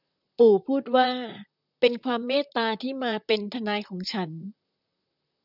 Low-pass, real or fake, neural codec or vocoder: 5.4 kHz; fake; vocoder, 44.1 kHz, 128 mel bands, Pupu-Vocoder